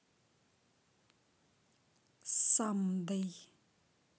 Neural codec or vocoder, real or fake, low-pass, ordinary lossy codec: none; real; none; none